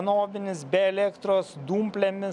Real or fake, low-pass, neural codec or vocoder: real; 10.8 kHz; none